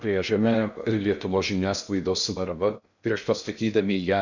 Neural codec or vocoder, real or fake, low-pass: codec, 16 kHz in and 24 kHz out, 0.6 kbps, FocalCodec, streaming, 2048 codes; fake; 7.2 kHz